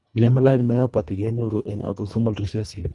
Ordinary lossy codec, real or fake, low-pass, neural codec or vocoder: none; fake; 10.8 kHz; codec, 24 kHz, 1.5 kbps, HILCodec